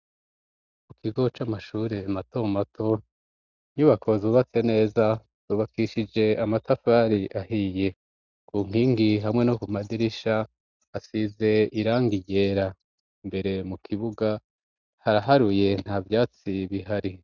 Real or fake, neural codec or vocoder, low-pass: real; none; 7.2 kHz